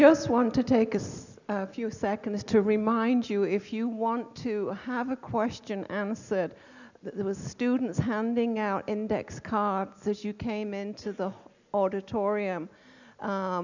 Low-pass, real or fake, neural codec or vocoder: 7.2 kHz; real; none